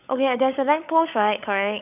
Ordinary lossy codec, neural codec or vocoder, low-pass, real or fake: none; codec, 16 kHz, 16 kbps, FunCodec, trained on LibriTTS, 50 frames a second; 3.6 kHz; fake